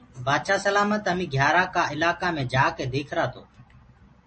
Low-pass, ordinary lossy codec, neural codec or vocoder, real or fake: 10.8 kHz; MP3, 32 kbps; none; real